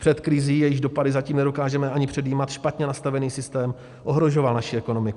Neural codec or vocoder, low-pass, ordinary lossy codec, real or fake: none; 10.8 kHz; Opus, 64 kbps; real